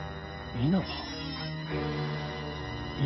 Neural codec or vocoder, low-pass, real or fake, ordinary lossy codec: none; 7.2 kHz; real; MP3, 24 kbps